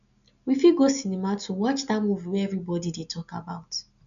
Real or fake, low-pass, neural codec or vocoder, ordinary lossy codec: real; 7.2 kHz; none; none